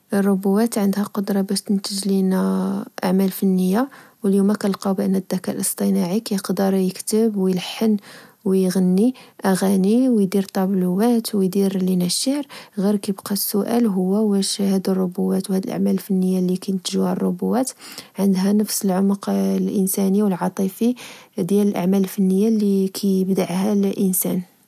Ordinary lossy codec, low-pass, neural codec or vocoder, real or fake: none; 14.4 kHz; none; real